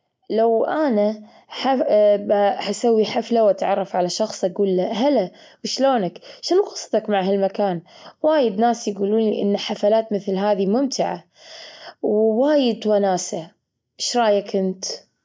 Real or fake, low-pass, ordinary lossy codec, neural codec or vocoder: real; none; none; none